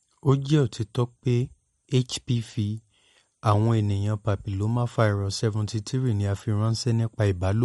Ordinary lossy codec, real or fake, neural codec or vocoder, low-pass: MP3, 48 kbps; real; none; 19.8 kHz